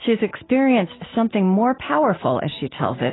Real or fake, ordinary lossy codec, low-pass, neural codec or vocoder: real; AAC, 16 kbps; 7.2 kHz; none